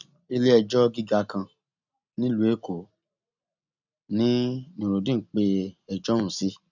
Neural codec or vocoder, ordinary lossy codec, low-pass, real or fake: none; none; 7.2 kHz; real